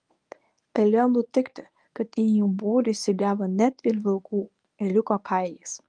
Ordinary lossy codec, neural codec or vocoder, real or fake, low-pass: Opus, 32 kbps; codec, 24 kHz, 0.9 kbps, WavTokenizer, medium speech release version 1; fake; 9.9 kHz